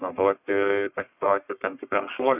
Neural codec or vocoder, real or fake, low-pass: codec, 44.1 kHz, 1.7 kbps, Pupu-Codec; fake; 3.6 kHz